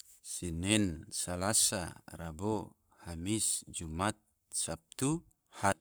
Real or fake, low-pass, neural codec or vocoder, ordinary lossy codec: fake; none; codec, 44.1 kHz, 7.8 kbps, Pupu-Codec; none